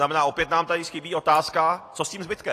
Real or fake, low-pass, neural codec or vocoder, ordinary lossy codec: real; 14.4 kHz; none; AAC, 48 kbps